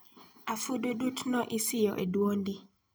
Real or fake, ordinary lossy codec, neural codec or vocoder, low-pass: real; none; none; none